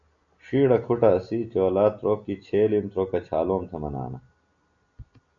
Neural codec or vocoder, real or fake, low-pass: none; real; 7.2 kHz